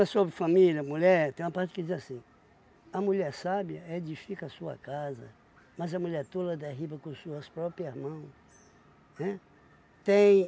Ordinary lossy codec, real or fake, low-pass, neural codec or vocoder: none; real; none; none